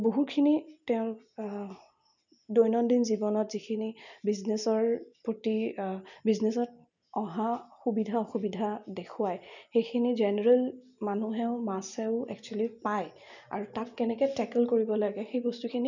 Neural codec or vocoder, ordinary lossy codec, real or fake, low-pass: none; none; real; 7.2 kHz